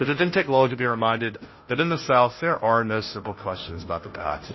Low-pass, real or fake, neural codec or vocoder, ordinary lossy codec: 7.2 kHz; fake; codec, 16 kHz, 0.5 kbps, FunCodec, trained on Chinese and English, 25 frames a second; MP3, 24 kbps